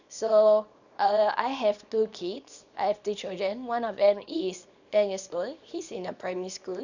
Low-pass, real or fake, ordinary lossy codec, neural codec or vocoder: 7.2 kHz; fake; none; codec, 24 kHz, 0.9 kbps, WavTokenizer, small release